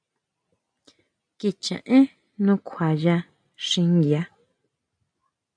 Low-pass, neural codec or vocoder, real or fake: 9.9 kHz; none; real